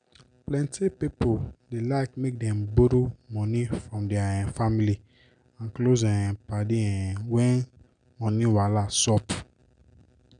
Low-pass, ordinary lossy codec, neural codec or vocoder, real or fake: 9.9 kHz; none; none; real